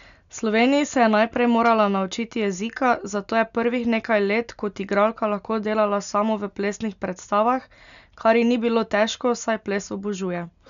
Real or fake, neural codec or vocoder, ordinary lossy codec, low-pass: real; none; none; 7.2 kHz